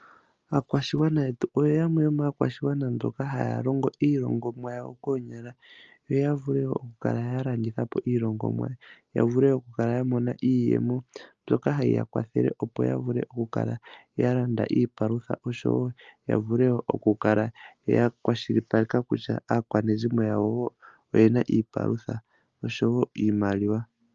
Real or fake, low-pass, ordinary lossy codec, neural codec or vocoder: real; 7.2 kHz; Opus, 24 kbps; none